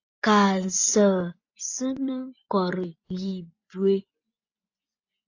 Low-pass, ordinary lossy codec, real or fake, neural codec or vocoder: 7.2 kHz; AAC, 48 kbps; fake; vocoder, 24 kHz, 100 mel bands, Vocos